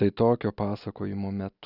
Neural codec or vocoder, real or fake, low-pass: none; real; 5.4 kHz